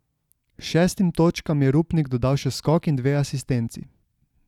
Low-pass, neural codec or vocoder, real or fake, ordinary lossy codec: 19.8 kHz; none; real; none